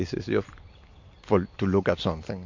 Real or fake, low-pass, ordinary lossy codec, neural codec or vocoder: real; 7.2 kHz; MP3, 48 kbps; none